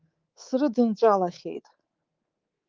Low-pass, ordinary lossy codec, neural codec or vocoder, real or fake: 7.2 kHz; Opus, 32 kbps; codec, 24 kHz, 3.1 kbps, DualCodec; fake